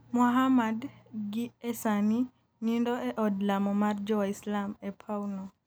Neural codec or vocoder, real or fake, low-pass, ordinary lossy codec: none; real; none; none